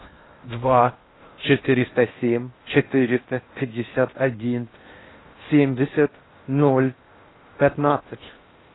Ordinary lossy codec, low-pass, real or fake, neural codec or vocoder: AAC, 16 kbps; 7.2 kHz; fake; codec, 16 kHz in and 24 kHz out, 0.6 kbps, FocalCodec, streaming, 4096 codes